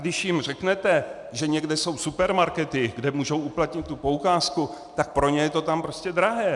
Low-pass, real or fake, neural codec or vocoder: 10.8 kHz; real; none